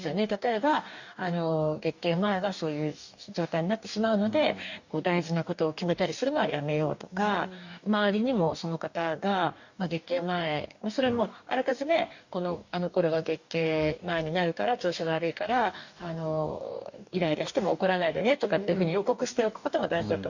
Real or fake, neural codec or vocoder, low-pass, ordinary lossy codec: fake; codec, 44.1 kHz, 2.6 kbps, DAC; 7.2 kHz; none